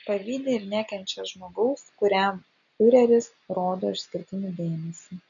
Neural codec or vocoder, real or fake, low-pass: none; real; 7.2 kHz